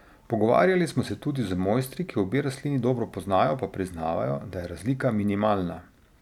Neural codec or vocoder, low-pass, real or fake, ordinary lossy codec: none; 19.8 kHz; real; none